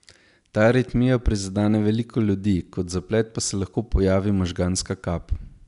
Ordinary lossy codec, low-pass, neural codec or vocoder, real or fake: none; 10.8 kHz; none; real